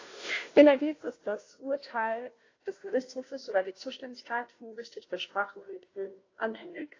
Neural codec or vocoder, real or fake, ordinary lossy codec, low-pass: codec, 16 kHz, 0.5 kbps, FunCodec, trained on Chinese and English, 25 frames a second; fake; AAC, 32 kbps; 7.2 kHz